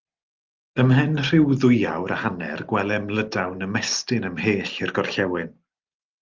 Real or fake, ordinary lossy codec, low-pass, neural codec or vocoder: real; Opus, 32 kbps; 7.2 kHz; none